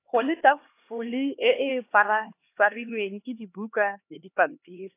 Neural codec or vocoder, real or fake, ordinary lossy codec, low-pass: codec, 16 kHz, 2 kbps, X-Codec, HuBERT features, trained on LibriSpeech; fake; AAC, 24 kbps; 3.6 kHz